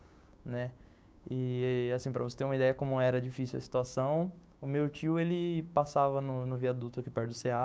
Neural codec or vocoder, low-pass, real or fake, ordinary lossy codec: codec, 16 kHz, 6 kbps, DAC; none; fake; none